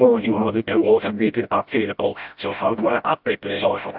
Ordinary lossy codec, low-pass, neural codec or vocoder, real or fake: AAC, 48 kbps; 5.4 kHz; codec, 16 kHz, 0.5 kbps, FreqCodec, smaller model; fake